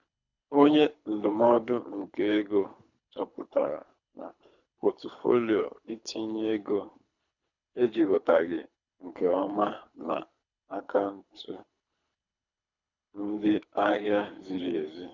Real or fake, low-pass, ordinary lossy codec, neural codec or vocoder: fake; 7.2 kHz; none; codec, 24 kHz, 3 kbps, HILCodec